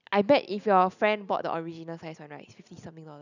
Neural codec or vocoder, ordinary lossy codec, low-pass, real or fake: none; none; 7.2 kHz; real